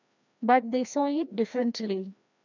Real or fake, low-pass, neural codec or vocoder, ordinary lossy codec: fake; 7.2 kHz; codec, 16 kHz, 1 kbps, FreqCodec, larger model; none